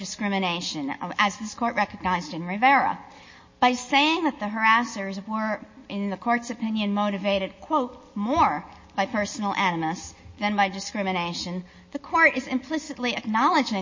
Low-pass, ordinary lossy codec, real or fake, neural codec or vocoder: 7.2 kHz; MP3, 32 kbps; real; none